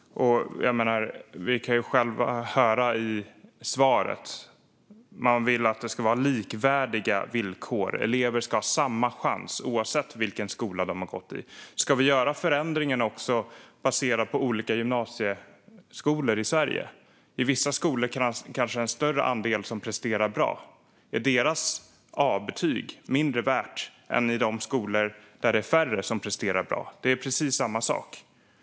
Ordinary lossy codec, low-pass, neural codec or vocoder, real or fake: none; none; none; real